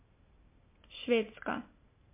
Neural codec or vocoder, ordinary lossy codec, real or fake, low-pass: none; MP3, 24 kbps; real; 3.6 kHz